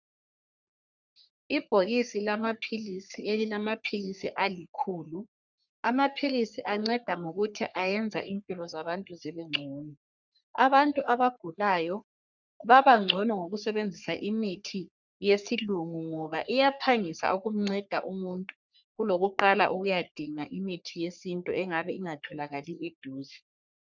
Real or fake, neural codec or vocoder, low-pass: fake; codec, 44.1 kHz, 3.4 kbps, Pupu-Codec; 7.2 kHz